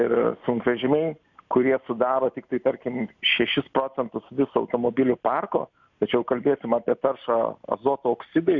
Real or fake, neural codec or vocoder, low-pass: real; none; 7.2 kHz